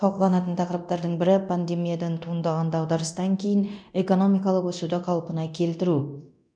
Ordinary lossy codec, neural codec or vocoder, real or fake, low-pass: none; codec, 24 kHz, 0.9 kbps, DualCodec; fake; 9.9 kHz